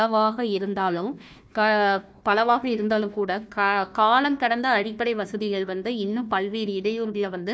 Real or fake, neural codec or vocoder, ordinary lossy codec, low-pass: fake; codec, 16 kHz, 1 kbps, FunCodec, trained on Chinese and English, 50 frames a second; none; none